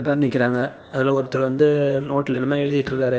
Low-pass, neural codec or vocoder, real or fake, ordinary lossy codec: none; codec, 16 kHz, 0.8 kbps, ZipCodec; fake; none